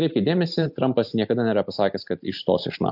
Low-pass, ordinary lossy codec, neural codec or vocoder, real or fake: 5.4 kHz; AAC, 48 kbps; none; real